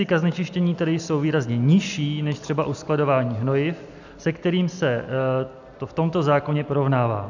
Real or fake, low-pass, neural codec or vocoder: real; 7.2 kHz; none